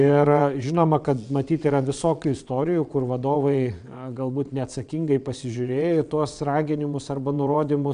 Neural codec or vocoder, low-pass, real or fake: vocoder, 22.05 kHz, 80 mel bands, WaveNeXt; 9.9 kHz; fake